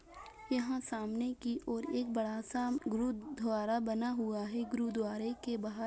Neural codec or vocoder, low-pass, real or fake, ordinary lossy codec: none; none; real; none